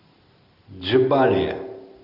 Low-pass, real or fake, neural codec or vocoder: 5.4 kHz; real; none